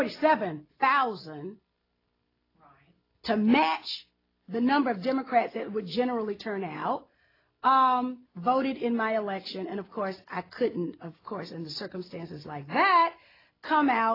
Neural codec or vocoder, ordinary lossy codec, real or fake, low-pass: none; AAC, 24 kbps; real; 5.4 kHz